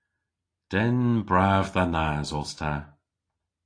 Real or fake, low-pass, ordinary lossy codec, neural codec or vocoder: real; 9.9 kHz; AAC, 48 kbps; none